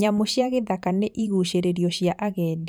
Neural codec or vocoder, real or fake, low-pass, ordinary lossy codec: vocoder, 44.1 kHz, 128 mel bands every 512 samples, BigVGAN v2; fake; none; none